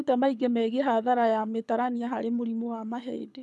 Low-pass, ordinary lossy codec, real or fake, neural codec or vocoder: none; none; fake; codec, 24 kHz, 6 kbps, HILCodec